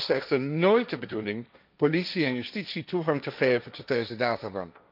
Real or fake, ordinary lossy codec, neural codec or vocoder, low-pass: fake; none; codec, 16 kHz, 1.1 kbps, Voila-Tokenizer; 5.4 kHz